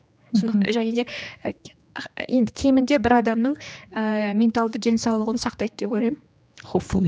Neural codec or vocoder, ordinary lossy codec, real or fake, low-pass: codec, 16 kHz, 2 kbps, X-Codec, HuBERT features, trained on general audio; none; fake; none